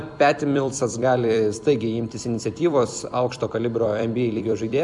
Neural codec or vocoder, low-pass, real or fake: vocoder, 44.1 kHz, 128 mel bands every 256 samples, BigVGAN v2; 10.8 kHz; fake